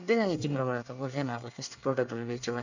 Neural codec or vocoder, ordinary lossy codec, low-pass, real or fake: codec, 24 kHz, 1 kbps, SNAC; none; 7.2 kHz; fake